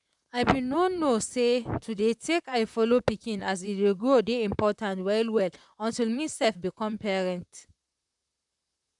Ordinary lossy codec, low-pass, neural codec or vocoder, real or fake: none; 10.8 kHz; vocoder, 44.1 kHz, 128 mel bands, Pupu-Vocoder; fake